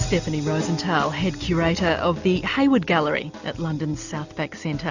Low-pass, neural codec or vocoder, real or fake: 7.2 kHz; none; real